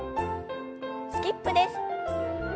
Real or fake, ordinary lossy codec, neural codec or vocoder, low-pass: real; none; none; none